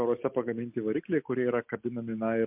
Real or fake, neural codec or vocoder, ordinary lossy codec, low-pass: real; none; MP3, 32 kbps; 3.6 kHz